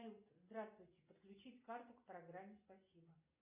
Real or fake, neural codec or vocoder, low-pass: real; none; 3.6 kHz